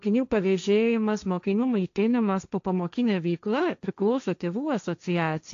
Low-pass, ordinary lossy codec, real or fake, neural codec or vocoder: 7.2 kHz; AAC, 64 kbps; fake; codec, 16 kHz, 1.1 kbps, Voila-Tokenizer